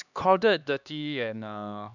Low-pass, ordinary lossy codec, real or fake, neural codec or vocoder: 7.2 kHz; none; fake; codec, 16 kHz, 2 kbps, X-Codec, HuBERT features, trained on LibriSpeech